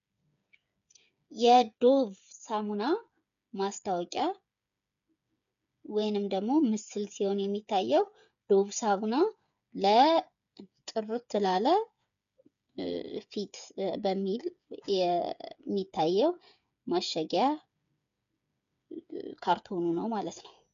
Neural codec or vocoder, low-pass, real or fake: codec, 16 kHz, 8 kbps, FreqCodec, smaller model; 7.2 kHz; fake